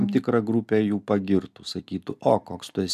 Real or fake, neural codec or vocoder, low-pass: real; none; 14.4 kHz